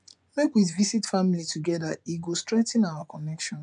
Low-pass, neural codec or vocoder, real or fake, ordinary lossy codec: 10.8 kHz; vocoder, 24 kHz, 100 mel bands, Vocos; fake; none